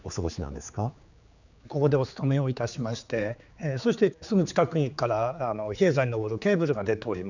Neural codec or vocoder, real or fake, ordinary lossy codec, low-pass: codec, 16 kHz, 4 kbps, X-Codec, HuBERT features, trained on general audio; fake; none; 7.2 kHz